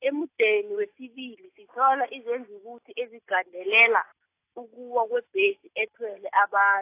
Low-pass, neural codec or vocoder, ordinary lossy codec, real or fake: 3.6 kHz; none; AAC, 24 kbps; real